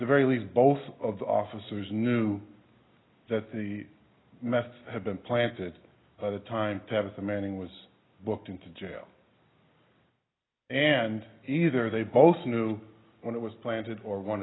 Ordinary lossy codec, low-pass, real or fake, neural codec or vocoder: AAC, 16 kbps; 7.2 kHz; real; none